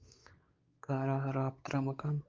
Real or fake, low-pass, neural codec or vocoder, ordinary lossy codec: fake; 7.2 kHz; codec, 16 kHz, 4 kbps, X-Codec, WavLM features, trained on Multilingual LibriSpeech; Opus, 32 kbps